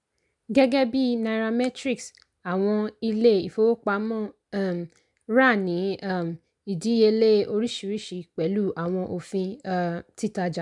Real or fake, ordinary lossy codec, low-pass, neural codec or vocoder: real; MP3, 96 kbps; 10.8 kHz; none